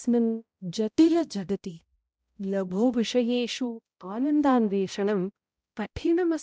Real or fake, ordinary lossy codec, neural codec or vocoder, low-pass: fake; none; codec, 16 kHz, 0.5 kbps, X-Codec, HuBERT features, trained on balanced general audio; none